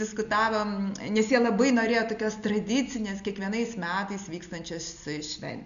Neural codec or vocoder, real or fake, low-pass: none; real; 7.2 kHz